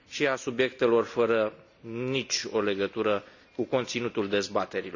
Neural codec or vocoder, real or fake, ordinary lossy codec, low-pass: none; real; none; 7.2 kHz